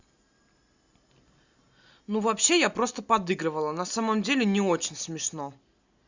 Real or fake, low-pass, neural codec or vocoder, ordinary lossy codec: real; 7.2 kHz; none; Opus, 64 kbps